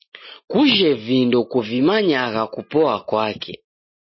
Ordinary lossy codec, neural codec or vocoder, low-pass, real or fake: MP3, 24 kbps; none; 7.2 kHz; real